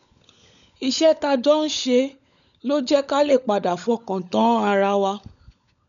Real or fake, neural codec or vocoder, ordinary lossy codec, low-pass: fake; codec, 16 kHz, 16 kbps, FunCodec, trained on LibriTTS, 50 frames a second; none; 7.2 kHz